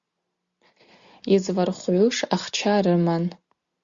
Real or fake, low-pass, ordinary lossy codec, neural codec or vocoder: real; 7.2 kHz; Opus, 64 kbps; none